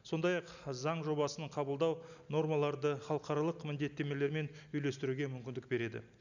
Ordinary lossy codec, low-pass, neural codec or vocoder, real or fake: none; 7.2 kHz; none; real